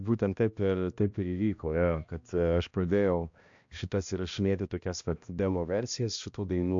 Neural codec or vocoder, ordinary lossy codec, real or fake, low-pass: codec, 16 kHz, 1 kbps, X-Codec, HuBERT features, trained on balanced general audio; AAC, 64 kbps; fake; 7.2 kHz